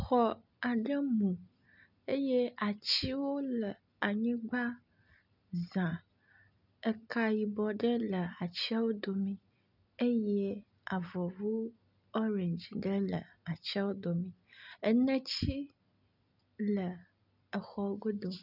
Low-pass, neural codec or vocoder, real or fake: 5.4 kHz; none; real